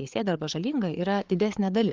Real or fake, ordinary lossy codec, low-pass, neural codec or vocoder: fake; Opus, 32 kbps; 7.2 kHz; codec, 16 kHz, 4 kbps, FreqCodec, larger model